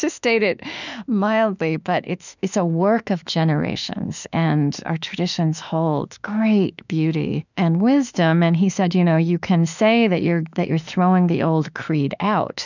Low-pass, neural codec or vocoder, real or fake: 7.2 kHz; autoencoder, 48 kHz, 32 numbers a frame, DAC-VAE, trained on Japanese speech; fake